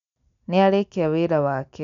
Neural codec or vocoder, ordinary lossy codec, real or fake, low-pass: none; none; real; 7.2 kHz